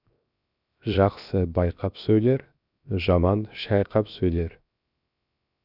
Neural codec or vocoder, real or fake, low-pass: codec, 16 kHz, 0.7 kbps, FocalCodec; fake; 5.4 kHz